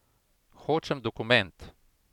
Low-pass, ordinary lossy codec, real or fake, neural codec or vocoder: 19.8 kHz; none; real; none